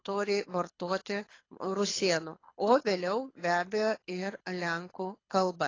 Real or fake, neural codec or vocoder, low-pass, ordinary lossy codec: fake; codec, 24 kHz, 6 kbps, HILCodec; 7.2 kHz; AAC, 32 kbps